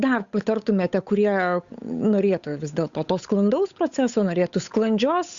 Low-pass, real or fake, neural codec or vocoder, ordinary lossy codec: 7.2 kHz; fake; codec, 16 kHz, 8 kbps, FunCodec, trained on Chinese and English, 25 frames a second; Opus, 64 kbps